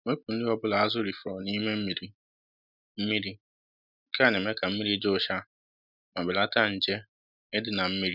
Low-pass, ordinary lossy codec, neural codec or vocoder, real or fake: 5.4 kHz; none; none; real